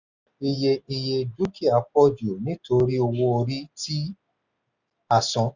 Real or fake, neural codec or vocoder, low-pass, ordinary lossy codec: real; none; 7.2 kHz; none